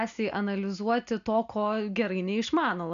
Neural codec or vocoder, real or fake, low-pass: none; real; 7.2 kHz